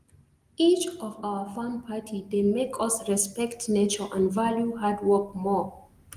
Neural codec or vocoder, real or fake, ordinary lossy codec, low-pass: none; real; Opus, 24 kbps; 14.4 kHz